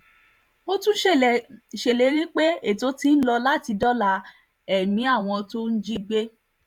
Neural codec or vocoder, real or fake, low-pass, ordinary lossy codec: vocoder, 44.1 kHz, 128 mel bands every 512 samples, BigVGAN v2; fake; 19.8 kHz; none